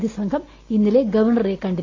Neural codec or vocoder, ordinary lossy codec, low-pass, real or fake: none; AAC, 32 kbps; 7.2 kHz; real